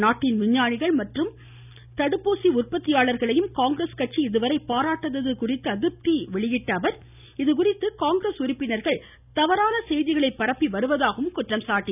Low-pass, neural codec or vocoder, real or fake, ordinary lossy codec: 3.6 kHz; none; real; none